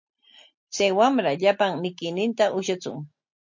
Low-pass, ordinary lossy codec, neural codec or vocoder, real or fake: 7.2 kHz; MP3, 48 kbps; none; real